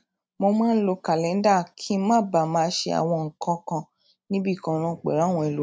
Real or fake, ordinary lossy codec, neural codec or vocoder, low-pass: real; none; none; none